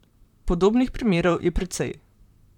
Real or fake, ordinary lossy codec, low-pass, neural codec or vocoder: fake; none; 19.8 kHz; vocoder, 44.1 kHz, 128 mel bands, Pupu-Vocoder